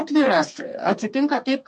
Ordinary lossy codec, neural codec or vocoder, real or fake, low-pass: MP3, 48 kbps; codec, 32 kHz, 1.9 kbps, SNAC; fake; 10.8 kHz